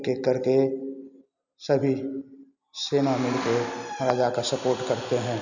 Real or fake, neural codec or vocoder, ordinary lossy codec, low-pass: real; none; none; 7.2 kHz